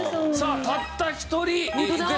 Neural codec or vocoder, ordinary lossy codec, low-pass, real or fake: none; none; none; real